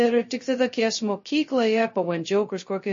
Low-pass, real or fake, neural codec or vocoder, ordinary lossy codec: 7.2 kHz; fake; codec, 16 kHz, 0.2 kbps, FocalCodec; MP3, 32 kbps